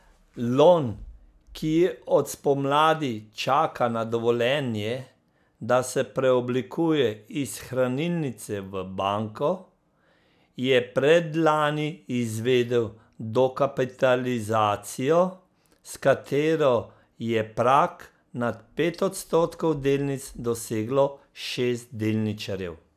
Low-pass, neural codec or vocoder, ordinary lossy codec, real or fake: 14.4 kHz; none; none; real